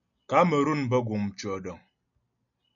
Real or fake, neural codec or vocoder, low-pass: real; none; 7.2 kHz